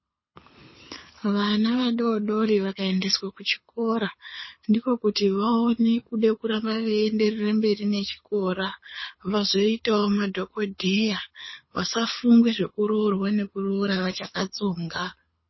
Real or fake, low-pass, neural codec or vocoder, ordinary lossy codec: fake; 7.2 kHz; codec, 24 kHz, 6 kbps, HILCodec; MP3, 24 kbps